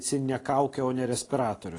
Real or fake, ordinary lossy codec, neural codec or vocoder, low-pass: real; AAC, 32 kbps; none; 10.8 kHz